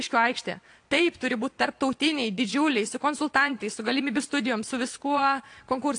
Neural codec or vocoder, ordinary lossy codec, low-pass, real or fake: vocoder, 22.05 kHz, 80 mel bands, Vocos; AAC, 48 kbps; 9.9 kHz; fake